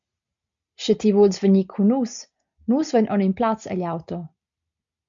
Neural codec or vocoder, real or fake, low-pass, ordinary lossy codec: none; real; 7.2 kHz; MP3, 64 kbps